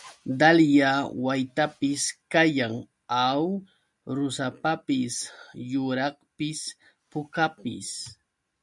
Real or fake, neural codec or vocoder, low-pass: real; none; 10.8 kHz